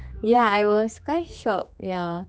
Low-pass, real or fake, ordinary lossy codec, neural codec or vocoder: none; fake; none; codec, 16 kHz, 2 kbps, X-Codec, HuBERT features, trained on general audio